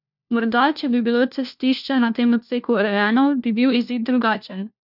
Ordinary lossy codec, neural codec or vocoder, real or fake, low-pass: none; codec, 16 kHz, 1 kbps, FunCodec, trained on LibriTTS, 50 frames a second; fake; 5.4 kHz